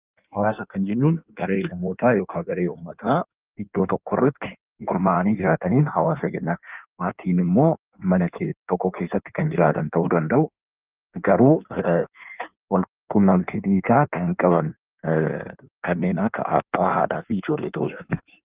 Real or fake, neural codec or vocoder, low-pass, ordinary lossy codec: fake; codec, 16 kHz in and 24 kHz out, 1.1 kbps, FireRedTTS-2 codec; 3.6 kHz; Opus, 24 kbps